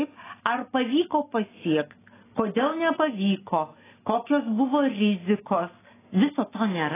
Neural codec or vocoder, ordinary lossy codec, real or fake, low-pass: none; AAC, 16 kbps; real; 3.6 kHz